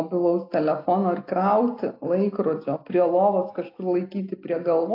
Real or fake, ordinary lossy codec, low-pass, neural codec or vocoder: real; AAC, 32 kbps; 5.4 kHz; none